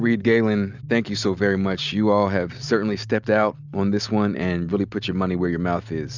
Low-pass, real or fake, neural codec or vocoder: 7.2 kHz; real; none